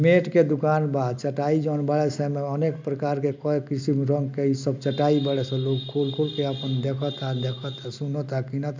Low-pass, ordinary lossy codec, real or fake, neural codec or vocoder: 7.2 kHz; MP3, 64 kbps; real; none